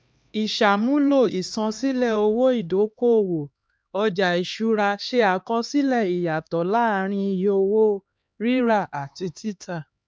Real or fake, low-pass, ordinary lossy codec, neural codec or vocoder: fake; none; none; codec, 16 kHz, 2 kbps, X-Codec, HuBERT features, trained on LibriSpeech